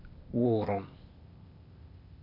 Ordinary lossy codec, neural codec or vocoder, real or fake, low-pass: MP3, 48 kbps; codec, 16 kHz, 0.8 kbps, ZipCodec; fake; 5.4 kHz